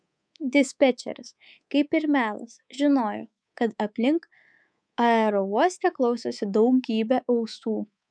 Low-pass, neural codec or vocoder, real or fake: 9.9 kHz; codec, 24 kHz, 3.1 kbps, DualCodec; fake